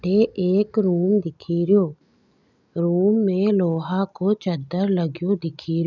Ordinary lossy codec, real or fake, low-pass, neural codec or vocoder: none; real; 7.2 kHz; none